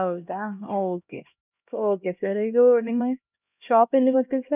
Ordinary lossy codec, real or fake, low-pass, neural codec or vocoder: none; fake; 3.6 kHz; codec, 16 kHz, 1 kbps, X-Codec, HuBERT features, trained on LibriSpeech